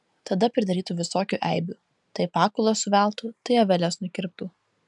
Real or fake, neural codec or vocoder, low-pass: real; none; 10.8 kHz